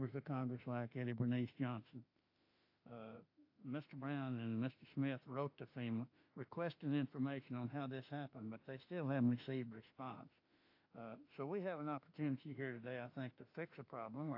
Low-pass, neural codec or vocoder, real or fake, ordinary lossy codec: 5.4 kHz; autoencoder, 48 kHz, 32 numbers a frame, DAC-VAE, trained on Japanese speech; fake; AAC, 32 kbps